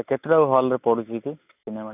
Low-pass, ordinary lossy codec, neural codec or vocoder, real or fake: 3.6 kHz; none; none; real